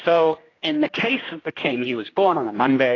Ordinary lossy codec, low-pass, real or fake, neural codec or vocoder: AAC, 32 kbps; 7.2 kHz; fake; codec, 16 kHz, 1 kbps, X-Codec, HuBERT features, trained on general audio